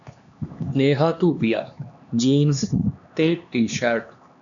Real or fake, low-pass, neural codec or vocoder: fake; 7.2 kHz; codec, 16 kHz, 2 kbps, X-Codec, HuBERT features, trained on LibriSpeech